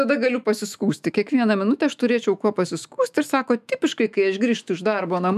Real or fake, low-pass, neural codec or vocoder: fake; 14.4 kHz; autoencoder, 48 kHz, 128 numbers a frame, DAC-VAE, trained on Japanese speech